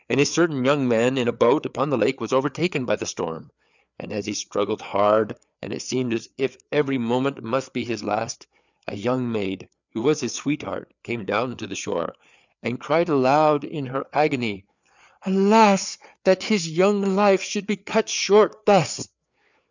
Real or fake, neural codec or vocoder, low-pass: fake; codec, 16 kHz, 4 kbps, FreqCodec, larger model; 7.2 kHz